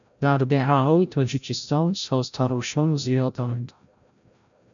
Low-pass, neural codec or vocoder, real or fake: 7.2 kHz; codec, 16 kHz, 0.5 kbps, FreqCodec, larger model; fake